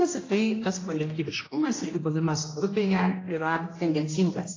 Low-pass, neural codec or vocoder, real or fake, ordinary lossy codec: 7.2 kHz; codec, 16 kHz, 1 kbps, X-Codec, HuBERT features, trained on general audio; fake; AAC, 32 kbps